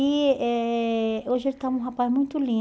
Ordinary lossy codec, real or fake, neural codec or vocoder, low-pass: none; real; none; none